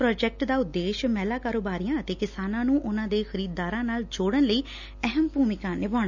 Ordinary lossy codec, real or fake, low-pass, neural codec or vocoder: none; real; 7.2 kHz; none